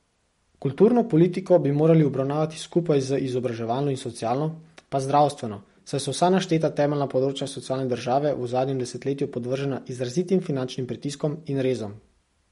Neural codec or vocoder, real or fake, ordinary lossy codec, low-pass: none; real; MP3, 48 kbps; 19.8 kHz